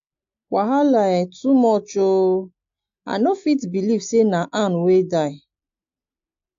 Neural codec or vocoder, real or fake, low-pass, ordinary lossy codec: none; real; 7.2 kHz; AAC, 48 kbps